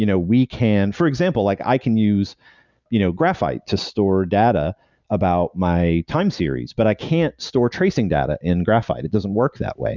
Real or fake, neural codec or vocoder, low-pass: real; none; 7.2 kHz